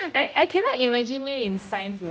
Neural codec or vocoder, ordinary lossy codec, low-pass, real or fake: codec, 16 kHz, 0.5 kbps, X-Codec, HuBERT features, trained on general audio; none; none; fake